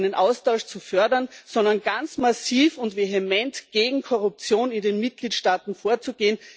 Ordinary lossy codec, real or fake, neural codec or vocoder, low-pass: none; real; none; none